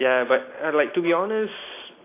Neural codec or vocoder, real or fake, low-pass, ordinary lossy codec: none; real; 3.6 kHz; AAC, 24 kbps